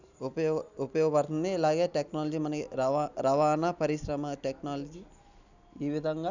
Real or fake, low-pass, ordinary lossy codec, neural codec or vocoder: real; 7.2 kHz; none; none